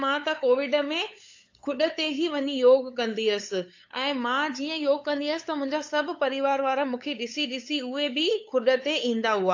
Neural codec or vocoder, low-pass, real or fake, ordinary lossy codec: codec, 16 kHz, 8 kbps, FunCodec, trained on LibriTTS, 25 frames a second; 7.2 kHz; fake; AAC, 48 kbps